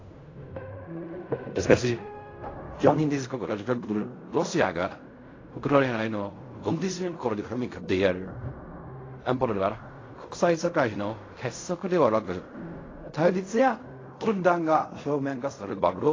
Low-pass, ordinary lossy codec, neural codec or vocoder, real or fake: 7.2 kHz; AAC, 32 kbps; codec, 16 kHz in and 24 kHz out, 0.4 kbps, LongCat-Audio-Codec, fine tuned four codebook decoder; fake